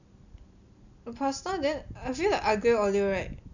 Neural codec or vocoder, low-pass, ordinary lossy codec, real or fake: none; 7.2 kHz; none; real